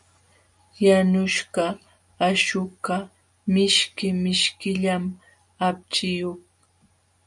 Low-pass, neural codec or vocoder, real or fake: 10.8 kHz; none; real